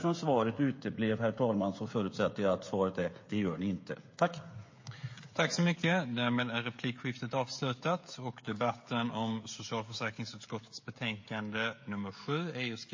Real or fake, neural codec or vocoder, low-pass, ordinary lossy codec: fake; codec, 16 kHz, 16 kbps, FreqCodec, smaller model; 7.2 kHz; MP3, 32 kbps